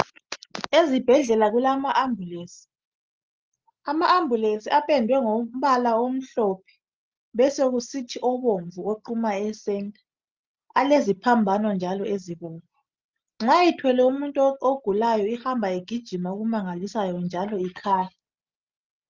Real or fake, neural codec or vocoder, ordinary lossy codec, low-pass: real; none; Opus, 24 kbps; 7.2 kHz